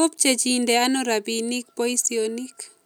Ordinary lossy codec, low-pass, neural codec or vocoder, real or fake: none; none; none; real